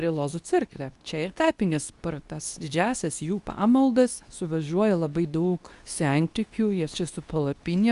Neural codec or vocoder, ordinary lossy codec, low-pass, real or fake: codec, 24 kHz, 0.9 kbps, WavTokenizer, medium speech release version 2; Opus, 64 kbps; 10.8 kHz; fake